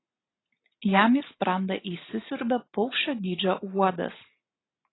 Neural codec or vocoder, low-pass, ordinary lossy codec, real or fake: none; 7.2 kHz; AAC, 16 kbps; real